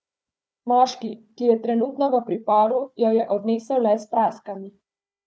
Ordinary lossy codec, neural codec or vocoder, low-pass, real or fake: none; codec, 16 kHz, 4 kbps, FunCodec, trained on Chinese and English, 50 frames a second; none; fake